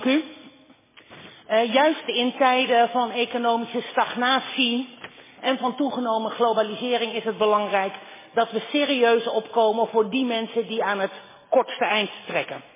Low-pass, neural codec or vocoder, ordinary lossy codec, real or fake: 3.6 kHz; none; MP3, 16 kbps; real